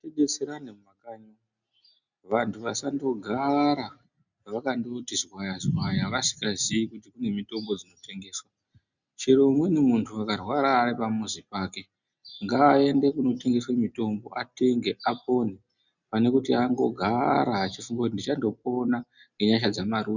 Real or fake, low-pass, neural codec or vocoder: real; 7.2 kHz; none